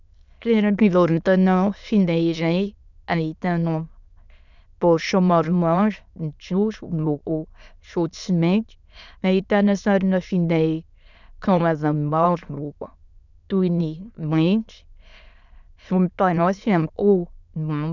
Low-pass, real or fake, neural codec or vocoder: 7.2 kHz; fake; autoencoder, 22.05 kHz, a latent of 192 numbers a frame, VITS, trained on many speakers